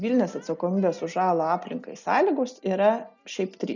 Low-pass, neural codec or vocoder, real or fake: 7.2 kHz; none; real